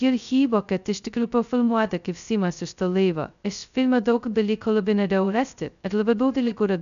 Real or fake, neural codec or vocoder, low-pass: fake; codec, 16 kHz, 0.2 kbps, FocalCodec; 7.2 kHz